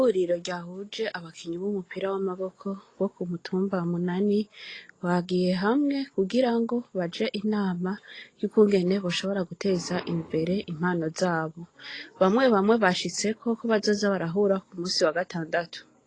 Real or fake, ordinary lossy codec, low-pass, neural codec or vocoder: real; AAC, 32 kbps; 9.9 kHz; none